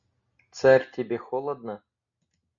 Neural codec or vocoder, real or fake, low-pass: none; real; 7.2 kHz